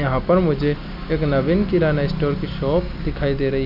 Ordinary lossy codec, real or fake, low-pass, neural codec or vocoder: none; real; 5.4 kHz; none